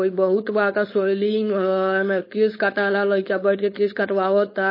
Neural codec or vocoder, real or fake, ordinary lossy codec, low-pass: codec, 16 kHz, 4.8 kbps, FACodec; fake; MP3, 24 kbps; 5.4 kHz